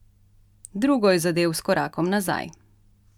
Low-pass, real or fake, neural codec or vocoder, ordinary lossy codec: 19.8 kHz; real; none; none